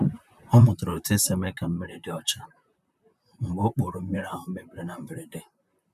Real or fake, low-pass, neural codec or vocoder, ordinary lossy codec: fake; 14.4 kHz; vocoder, 44.1 kHz, 128 mel bands, Pupu-Vocoder; none